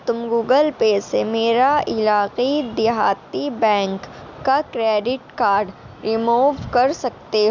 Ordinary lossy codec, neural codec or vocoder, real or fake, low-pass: none; none; real; 7.2 kHz